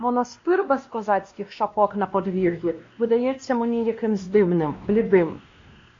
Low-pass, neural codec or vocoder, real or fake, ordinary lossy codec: 7.2 kHz; codec, 16 kHz, 1 kbps, X-Codec, WavLM features, trained on Multilingual LibriSpeech; fake; AAC, 48 kbps